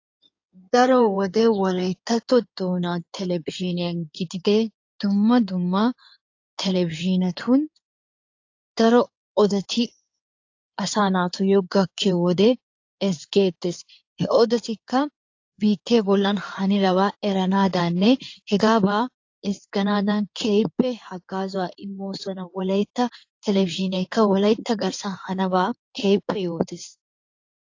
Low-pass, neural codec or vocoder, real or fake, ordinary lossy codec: 7.2 kHz; codec, 16 kHz in and 24 kHz out, 2.2 kbps, FireRedTTS-2 codec; fake; AAC, 48 kbps